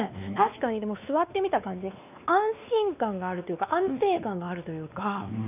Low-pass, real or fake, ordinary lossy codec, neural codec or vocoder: 3.6 kHz; fake; none; codec, 16 kHz, 2 kbps, X-Codec, WavLM features, trained on Multilingual LibriSpeech